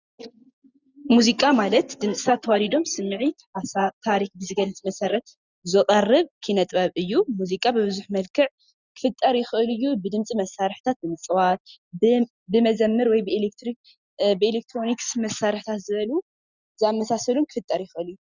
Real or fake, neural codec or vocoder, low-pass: real; none; 7.2 kHz